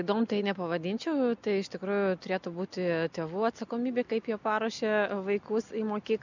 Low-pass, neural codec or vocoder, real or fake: 7.2 kHz; none; real